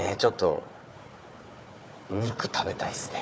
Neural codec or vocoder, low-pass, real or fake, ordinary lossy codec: codec, 16 kHz, 4 kbps, FunCodec, trained on Chinese and English, 50 frames a second; none; fake; none